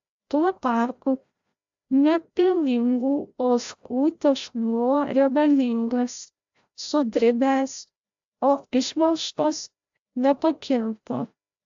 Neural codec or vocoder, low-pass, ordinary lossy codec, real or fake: codec, 16 kHz, 0.5 kbps, FreqCodec, larger model; 7.2 kHz; AAC, 64 kbps; fake